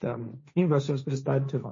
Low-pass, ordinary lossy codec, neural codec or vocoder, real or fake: 7.2 kHz; MP3, 32 kbps; codec, 16 kHz, 1.1 kbps, Voila-Tokenizer; fake